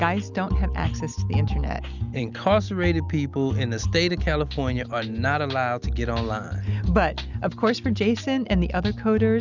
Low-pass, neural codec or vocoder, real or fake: 7.2 kHz; none; real